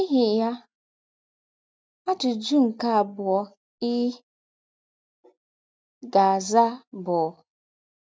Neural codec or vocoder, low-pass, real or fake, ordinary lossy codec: none; none; real; none